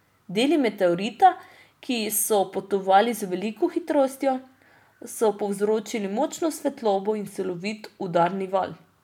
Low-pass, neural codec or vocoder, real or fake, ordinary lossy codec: 19.8 kHz; none; real; none